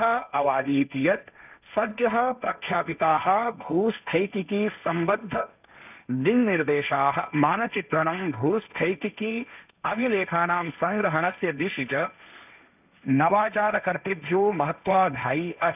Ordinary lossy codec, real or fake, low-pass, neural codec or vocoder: none; fake; 3.6 kHz; codec, 16 kHz, 1.1 kbps, Voila-Tokenizer